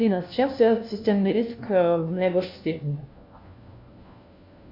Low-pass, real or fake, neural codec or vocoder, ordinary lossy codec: 5.4 kHz; fake; codec, 16 kHz, 1 kbps, FunCodec, trained on LibriTTS, 50 frames a second; MP3, 48 kbps